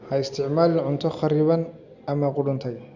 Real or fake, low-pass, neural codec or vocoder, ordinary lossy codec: real; 7.2 kHz; none; Opus, 64 kbps